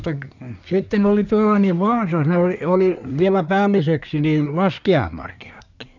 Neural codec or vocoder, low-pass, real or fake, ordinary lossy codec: codec, 24 kHz, 1 kbps, SNAC; 7.2 kHz; fake; none